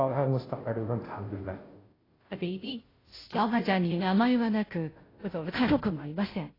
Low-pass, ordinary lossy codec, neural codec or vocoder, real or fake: 5.4 kHz; AAC, 24 kbps; codec, 16 kHz, 0.5 kbps, FunCodec, trained on Chinese and English, 25 frames a second; fake